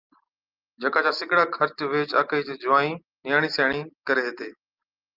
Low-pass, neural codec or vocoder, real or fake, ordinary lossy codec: 5.4 kHz; none; real; Opus, 24 kbps